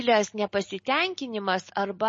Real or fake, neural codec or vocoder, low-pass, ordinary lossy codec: real; none; 10.8 kHz; MP3, 32 kbps